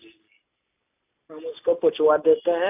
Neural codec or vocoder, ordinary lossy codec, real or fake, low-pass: none; AAC, 32 kbps; real; 3.6 kHz